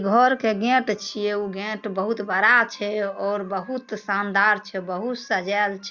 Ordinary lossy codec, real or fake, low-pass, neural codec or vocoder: Opus, 64 kbps; real; 7.2 kHz; none